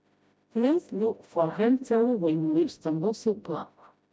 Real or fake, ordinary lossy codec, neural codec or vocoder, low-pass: fake; none; codec, 16 kHz, 0.5 kbps, FreqCodec, smaller model; none